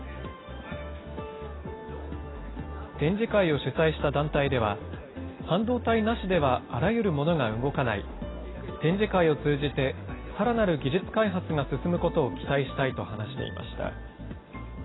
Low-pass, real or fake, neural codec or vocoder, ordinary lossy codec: 7.2 kHz; real; none; AAC, 16 kbps